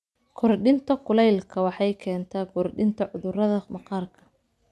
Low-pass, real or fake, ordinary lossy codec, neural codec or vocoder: none; real; none; none